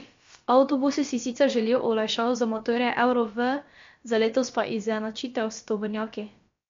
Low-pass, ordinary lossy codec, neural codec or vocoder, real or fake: 7.2 kHz; MP3, 48 kbps; codec, 16 kHz, about 1 kbps, DyCAST, with the encoder's durations; fake